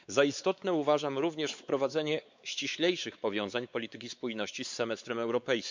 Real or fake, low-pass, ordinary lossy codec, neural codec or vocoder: fake; 7.2 kHz; MP3, 64 kbps; codec, 16 kHz, 4 kbps, X-Codec, WavLM features, trained on Multilingual LibriSpeech